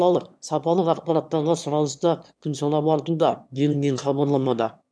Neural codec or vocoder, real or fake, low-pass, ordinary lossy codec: autoencoder, 22.05 kHz, a latent of 192 numbers a frame, VITS, trained on one speaker; fake; none; none